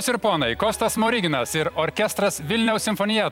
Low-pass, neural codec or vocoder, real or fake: 19.8 kHz; vocoder, 44.1 kHz, 128 mel bands every 256 samples, BigVGAN v2; fake